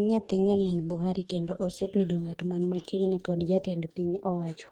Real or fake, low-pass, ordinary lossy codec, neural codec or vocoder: fake; 19.8 kHz; Opus, 24 kbps; codec, 44.1 kHz, 2.6 kbps, DAC